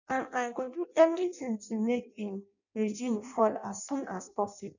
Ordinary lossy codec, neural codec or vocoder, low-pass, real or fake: none; codec, 16 kHz in and 24 kHz out, 0.6 kbps, FireRedTTS-2 codec; 7.2 kHz; fake